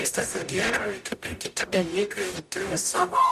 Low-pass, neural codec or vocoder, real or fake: 14.4 kHz; codec, 44.1 kHz, 0.9 kbps, DAC; fake